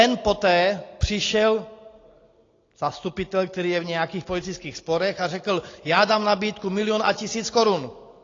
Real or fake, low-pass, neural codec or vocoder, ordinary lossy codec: real; 7.2 kHz; none; AAC, 32 kbps